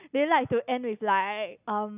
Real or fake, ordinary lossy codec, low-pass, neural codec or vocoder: real; none; 3.6 kHz; none